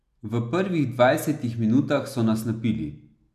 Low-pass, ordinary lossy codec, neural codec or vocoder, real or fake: 14.4 kHz; none; none; real